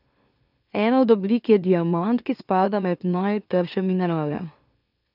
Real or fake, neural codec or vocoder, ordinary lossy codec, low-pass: fake; autoencoder, 44.1 kHz, a latent of 192 numbers a frame, MeloTTS; none; 5.4 kHz